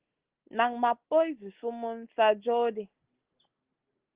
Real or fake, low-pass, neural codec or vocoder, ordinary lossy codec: real; 3.6 kHz; none; Opus, 16 kbps